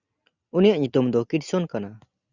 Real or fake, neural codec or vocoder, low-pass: real; none; 7.2 kHz